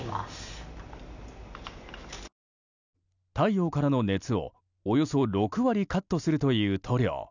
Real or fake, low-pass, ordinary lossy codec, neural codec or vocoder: real; 7.2 kHz; none; none